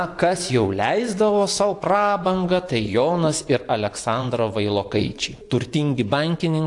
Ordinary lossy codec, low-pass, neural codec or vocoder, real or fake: AAC, 64 kbps; 10.8 kHz; vocoder, 24 kHz, 100 mel bands, Vocos; fake